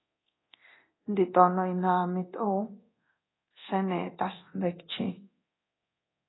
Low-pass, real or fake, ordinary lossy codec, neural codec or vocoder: 7.2 kHz; fake; AAC, 16 kbps; codec, 24 kHz, 0.9 kbps, DualCodec